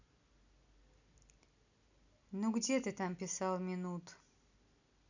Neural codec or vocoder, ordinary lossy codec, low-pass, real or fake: none; none; 7.2 kHz; real